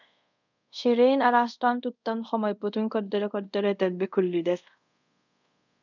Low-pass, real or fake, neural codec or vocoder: 7.2 kHz; fake; codec, 24 kHz, 0.5 kbps, DualCodec